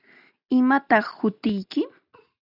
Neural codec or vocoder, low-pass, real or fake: none; 5.4 kHz; real